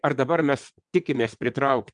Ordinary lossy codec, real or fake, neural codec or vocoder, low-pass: MP3, 96 kbps; fake; vocoder, 22.05 kHz, 80 mel bands, WaveNeXt; 9.9 kHz